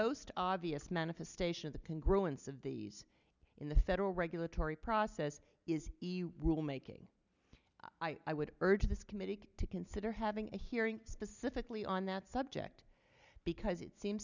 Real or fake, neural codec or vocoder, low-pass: real; none; 7.2 kHz